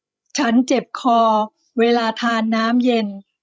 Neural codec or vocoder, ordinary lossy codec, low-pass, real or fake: codec, 16 kHz, 16 kbps, FreqCodec, larger model; none; none; fake